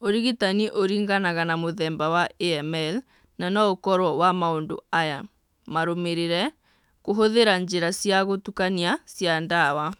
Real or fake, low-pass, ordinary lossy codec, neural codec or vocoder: fake; 19.8 kHz; none; autoencoder, 48 kHz, 128 numbers a frame, DAC-VAE, trained on Japanese speech